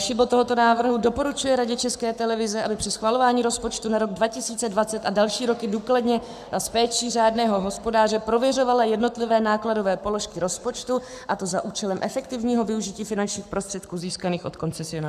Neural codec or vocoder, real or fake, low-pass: codec, 44.1 kHz, 7.8 kbps, Pupu-Codec; fake; 14.4 kHz